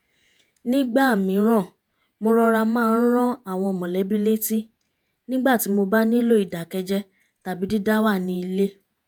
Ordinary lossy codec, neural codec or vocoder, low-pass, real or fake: none; vocoder, 48 kHz, 128 mel bands, Vocos; none; fake